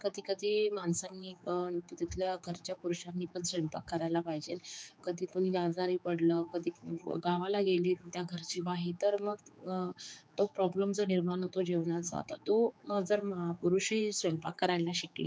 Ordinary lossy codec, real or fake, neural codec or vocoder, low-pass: none; fake; codec, 16 kHz, 4 kbps, X-Codec, HuBERT features, trained on general audio; none